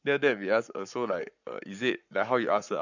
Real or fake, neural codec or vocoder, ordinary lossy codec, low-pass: fake; codec, 44.1 kHz, 7.8 kbps, Pupu-Codec; none; 7.2 kHz